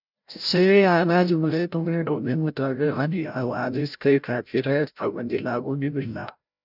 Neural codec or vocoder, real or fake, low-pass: codec, 16 kHz, 0.5 kbps, FreqCodec, larger model; fake; 5.4 kHz